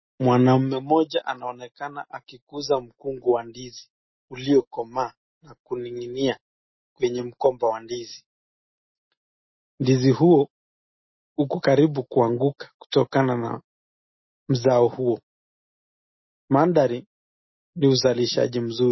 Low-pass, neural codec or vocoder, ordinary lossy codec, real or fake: 7.2 kHz; none; MP3, 24 kbps; real